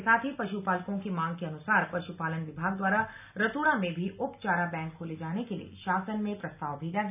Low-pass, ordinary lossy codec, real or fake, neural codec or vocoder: 3.6 kHz; none; real; none